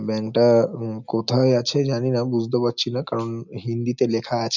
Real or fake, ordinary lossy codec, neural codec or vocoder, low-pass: real; none; none; 7.2 kHz